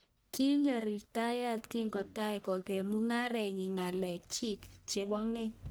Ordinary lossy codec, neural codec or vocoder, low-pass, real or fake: none; codec, 44.1 kHz, 1.7 kbps, Pupu-Codec; none; fake